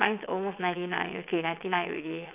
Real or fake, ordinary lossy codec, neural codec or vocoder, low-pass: fake; none; vocoder, 22.05 kHz, 80 mel bands, WaveNeXt; 3.6 kHz